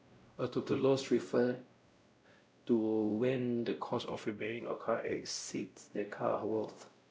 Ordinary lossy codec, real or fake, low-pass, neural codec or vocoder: none; fake; none; codec, 16 kHz, 0.5 kbps, X-Codec, WavLM features, trained on Multilingual LibriSpeech